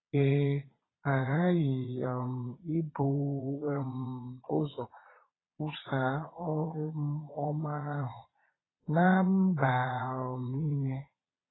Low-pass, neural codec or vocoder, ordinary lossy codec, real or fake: 7.2 kHz; vocoder, 22.05 kHz, 80 mel bands, Vocos; AAC, 16 kbps; fake